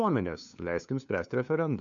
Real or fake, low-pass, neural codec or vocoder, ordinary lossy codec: fake; 7.2 kHz; codec, 16 kHz, 2 kbps, FunCodec, trained on LibriTTS, 25 frames a second; MP3, 64 kbps